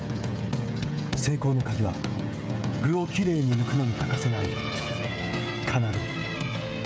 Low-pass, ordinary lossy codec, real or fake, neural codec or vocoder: none; none; fake; codec, 16 kHz, 16 kbps, FreqCodec, smaller model